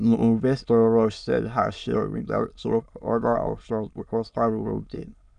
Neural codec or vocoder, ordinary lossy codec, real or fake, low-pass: autoencoder, 22.05 kHz, a latent of 192 numbers a frame, VITS, trained on many speakers; none; fake; 9.9 kHz